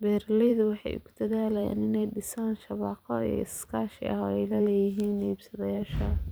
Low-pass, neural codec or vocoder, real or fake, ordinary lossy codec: none; vocoder, 44.1 kHz, 128 mel bands every 512 samples, BigVGAN v2; fake; none